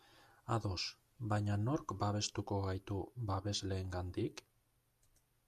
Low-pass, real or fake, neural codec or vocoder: 14.4 kHz; real; none